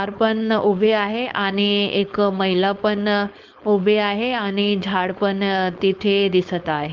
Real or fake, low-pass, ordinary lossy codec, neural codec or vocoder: fake; 7.2 kHz; Opus, 32 kbps; codec, 16 kHz, 4.8 kbps, FACodec